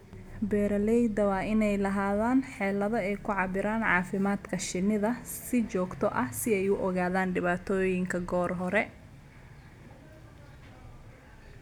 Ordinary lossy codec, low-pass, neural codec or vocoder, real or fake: none; 19.8 kHz; none; real